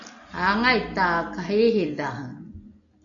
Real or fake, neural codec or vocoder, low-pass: real; none; 7.2 kHz